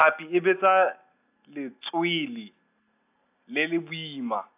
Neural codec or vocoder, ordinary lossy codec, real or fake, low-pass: none; AAC, 32 kbps; real; 3.6 kHz